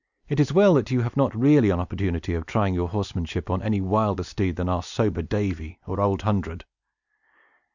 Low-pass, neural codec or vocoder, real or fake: 7.2 kHz; none; real